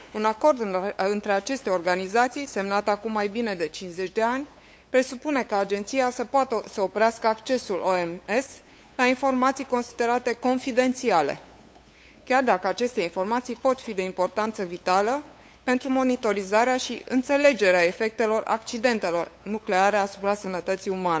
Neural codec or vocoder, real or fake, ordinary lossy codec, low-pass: codec, 16 kHz, 8 kbps, FunCodec, trained on LibriTTS, 25 frames a second; fake; none; none